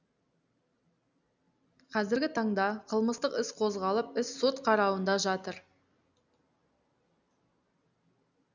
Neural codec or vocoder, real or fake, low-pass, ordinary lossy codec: none; real; 7.2 kHz; none